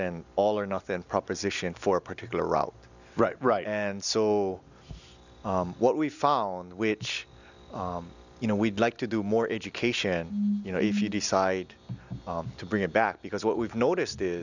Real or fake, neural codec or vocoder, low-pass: real; none; 7.2 kHz